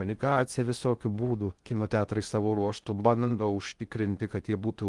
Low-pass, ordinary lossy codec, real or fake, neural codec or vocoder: 10.8 kHz; Opus, 24 kbps; fake; codec, 16 kHz in and 24 kHz out, 0.6 kbps, FocalCodec, streaming, 2048 codes